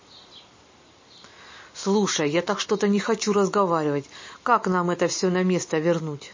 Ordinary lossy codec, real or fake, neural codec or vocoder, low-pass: MP3, 32 kbps; real; none; 7.2 kHz